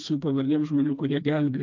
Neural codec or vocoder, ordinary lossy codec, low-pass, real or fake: codec, 16 kHz, 2 kbps, FreqCodec, smaller model; MP3, 64 kbps; 7.2 kHz; fake